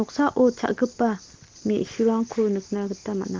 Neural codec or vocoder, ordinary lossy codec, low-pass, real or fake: none; Opus, 16 kbps; 7.2 kHz; real